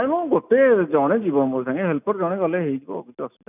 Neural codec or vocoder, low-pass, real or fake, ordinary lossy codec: none; 3.6 kHz; real; none